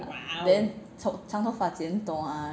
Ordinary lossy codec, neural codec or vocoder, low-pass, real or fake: none; none; none; real